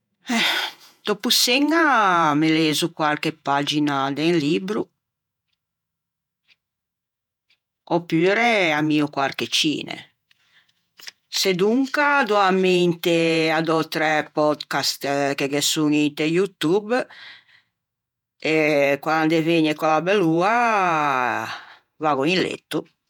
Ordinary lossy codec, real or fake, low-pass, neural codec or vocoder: none; fake; 19.8 kHz; vocoder, 48 kHz, 128 mel bands, Vocos